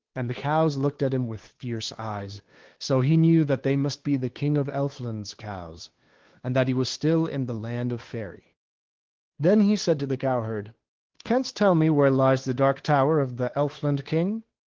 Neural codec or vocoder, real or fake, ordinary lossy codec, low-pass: codec, 16 kHz, 2 kbps, FunCodec, trained on Chinese and English, 25 frames a second; fake; Opus, 16 kbps; 7.2 kHz